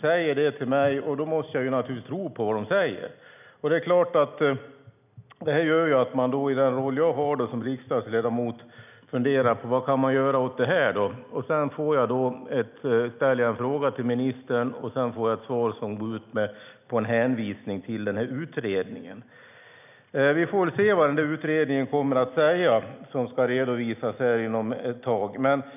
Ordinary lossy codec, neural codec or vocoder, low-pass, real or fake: none; none; 3.6 kHz; real